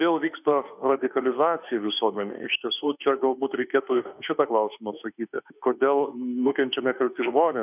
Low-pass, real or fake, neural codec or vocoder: 3.6 kHz; fake; autoencoder, 48 kHz, 32 numbers a frame, DAC-VAE, trained on Japanese speech